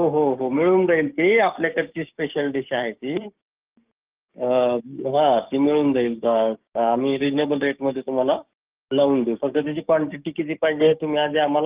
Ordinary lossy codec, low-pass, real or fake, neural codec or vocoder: Opus, 32 kbps; 3.6 kHz; fake; codec, 16 kHz, 6 kbps, DAC